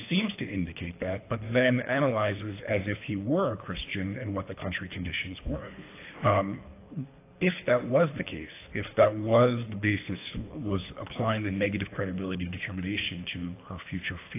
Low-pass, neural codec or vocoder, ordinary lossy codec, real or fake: 3.6 kHz; codec, 24 kHz, 3 kbps, HILCodec; AAC, 24 kbps; fake